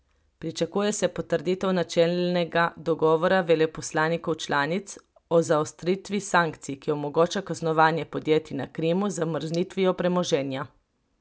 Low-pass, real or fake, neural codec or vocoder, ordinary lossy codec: none; real; none; none